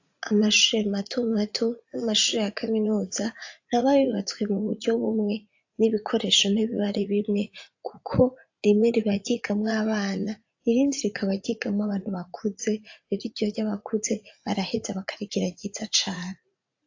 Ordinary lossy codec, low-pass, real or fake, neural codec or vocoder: AAC, 48 kbps; 7.2 kHz; fake; vocoder, 44.1 kHz, 80 mel bands, Vocos